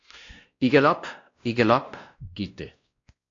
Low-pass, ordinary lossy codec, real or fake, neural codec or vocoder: 7.2 kHz; AAC, 48 kbps; fake; codec, 16 kHz, 1 kbps, X-Codec, WavLM features, trained on Multilingual LibriSpeech